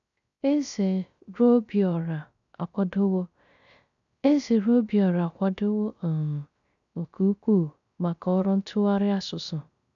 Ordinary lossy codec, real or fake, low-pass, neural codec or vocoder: none; fake; 7.2 kHz; codec, 16 kHz, 0.3 kbps, FocalCodec